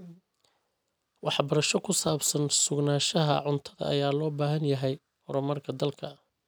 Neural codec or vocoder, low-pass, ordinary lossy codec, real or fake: none; none; none; real